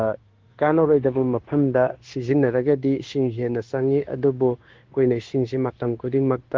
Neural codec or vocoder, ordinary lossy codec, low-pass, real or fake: codec, 16 kHz, 0.9 kbps, LongCat-Audio-Codec; Opus, 16 kbps; 7.2 kHz; fake